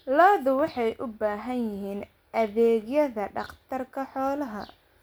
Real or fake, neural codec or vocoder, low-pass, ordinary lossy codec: real; none; none; none